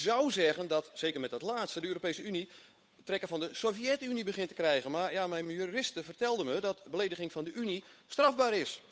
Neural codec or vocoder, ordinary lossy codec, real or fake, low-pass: codec, 16 kHz, 8 kbps, FunCodec, trained on Chinese and English, 25 frames a second; none; fake; none